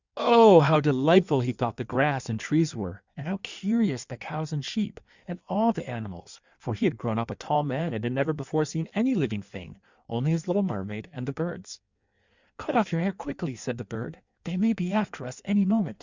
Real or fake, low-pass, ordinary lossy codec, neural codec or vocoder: fake; 7.2 kHz; Opus, 64 kbps; codec, 16 kHz in and 24 kHz out, 1.1 kbps, FireRedTTS-2 codec